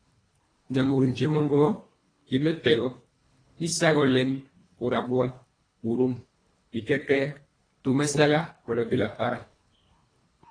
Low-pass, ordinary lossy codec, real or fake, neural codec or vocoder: 9.9 kHz; AAC, 32 kbps; fake; codec, 24 kHz, 1.5 kbps, HILCodec